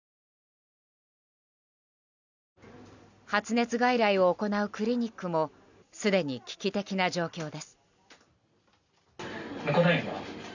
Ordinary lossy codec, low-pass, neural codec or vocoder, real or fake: none; 7.2 kHz; none; real